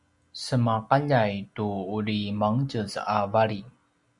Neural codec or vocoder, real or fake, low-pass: none; real; 10.8 kHz